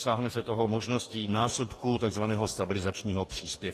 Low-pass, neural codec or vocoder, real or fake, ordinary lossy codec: 14.4 kHz; codec, 44.1 kHz, 2.6 kbps, DAC; fake; AAC, 48 kbps